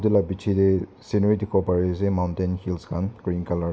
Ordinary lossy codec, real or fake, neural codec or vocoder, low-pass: none; real; none; none